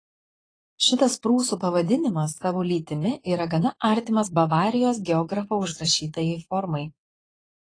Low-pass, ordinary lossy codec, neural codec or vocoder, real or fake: 9.9 kHz; AAC, 32 kbps; vocoder, 22.05 kHz, 80 mel bands, Vocos; fake